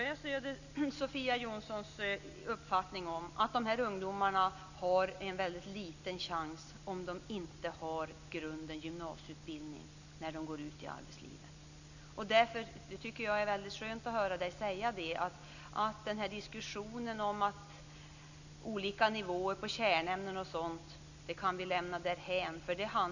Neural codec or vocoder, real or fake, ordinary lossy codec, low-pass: none; real; none; 7.2 kHz